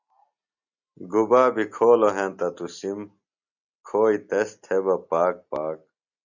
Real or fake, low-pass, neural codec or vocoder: real; 7.2 kHz; none